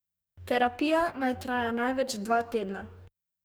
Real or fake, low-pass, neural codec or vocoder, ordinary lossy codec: fake; none; codec, 44.1 kHz, 2.6 kbps, DAC; none